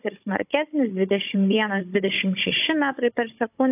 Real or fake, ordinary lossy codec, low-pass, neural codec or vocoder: fake; AAC, 32 kbps; 3.6 kHz; codec, 16 kHz, 16 kbps, FunCodec, trained on Chinese and English, 50 frames a second